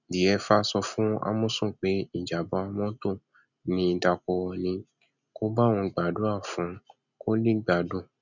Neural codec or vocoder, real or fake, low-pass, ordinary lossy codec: none; real; 7.2 kHz; none